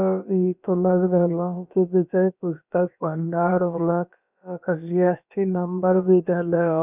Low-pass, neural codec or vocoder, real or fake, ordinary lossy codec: 3.6 kHz; codec, 16 kHz, about 1 kbps, DyCAST, with the encoder's durations; fake; none